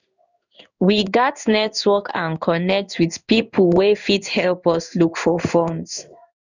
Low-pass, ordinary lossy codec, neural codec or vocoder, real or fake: 7.2 kHz; none; codec, 16 kHz in and 24 kHz out, 1 kbps, XY-Tokenizer; fake